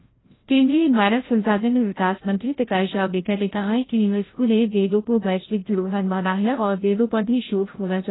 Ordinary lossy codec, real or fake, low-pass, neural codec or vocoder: AAC, 16 kbps; fake; 7.2 kHz; codec, 16 kHz, 0.5 kbps, FreqCodec, larger model